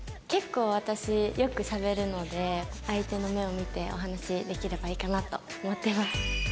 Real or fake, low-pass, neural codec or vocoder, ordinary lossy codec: real; none; none; none